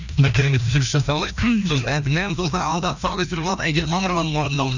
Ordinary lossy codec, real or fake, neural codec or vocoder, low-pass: none; fake; codec, 16 kHz, 1 kbps, FreqCodec, larger model; 7.2 kHz